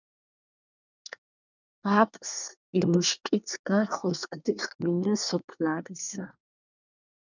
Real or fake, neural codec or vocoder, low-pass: fake; codec, 24 kHz, 1 kbps, SNAC; 7.2 kHz